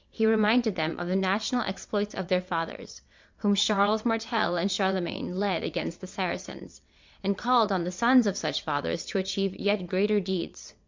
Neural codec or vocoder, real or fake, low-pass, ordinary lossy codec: vocoder, 22.05 kHz, 80 mel bands, WaveNeXt; fake; 7.2 kHz; MP3, 64 kbps